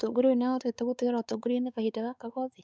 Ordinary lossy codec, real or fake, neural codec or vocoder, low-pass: none; fake; codec, 16 kHz, 4 kbps, X-Codec, WavLM features, trained on Multilingual LibriSpeech; none